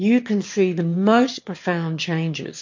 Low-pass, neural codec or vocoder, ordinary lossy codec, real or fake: 7.2 kHz; autoencoder, 22.05 kHz, a latent of 192 numbers a frame, VITS, trained on one speaker; MP3, 48 kbps; fake